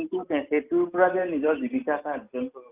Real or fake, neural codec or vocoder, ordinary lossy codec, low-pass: real; none; Opus, 24 kbps; 3.6 kHz